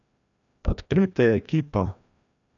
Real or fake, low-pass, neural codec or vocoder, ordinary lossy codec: fake; 7.2 kHz; codec, 16 kHz, 1 kbps, FreqCodec, larger model; none